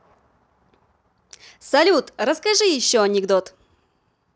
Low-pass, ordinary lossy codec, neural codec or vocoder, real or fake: none; none; none; real